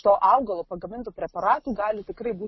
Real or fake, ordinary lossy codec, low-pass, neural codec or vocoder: real; MP3, 24 kbps; 7.2 kHz; none